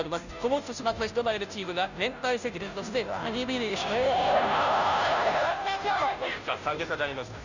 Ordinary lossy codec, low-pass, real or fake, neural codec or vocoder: none; 7.2 kHz; fake; codec, 16 kHz, 0.5 kbps, FunCodec, trained on Chinese and English, 25 frames a second